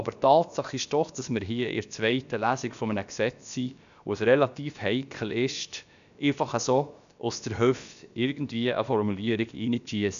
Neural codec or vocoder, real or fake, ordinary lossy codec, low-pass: codec, 16 kHz, about 1 kbps, DyCAST, with the encoder's durations; fake; none; 7.2 kHz